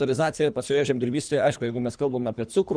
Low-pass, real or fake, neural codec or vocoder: 9.9 kHz; fake; codec, 24 kHz, 3 kbps, HILCodec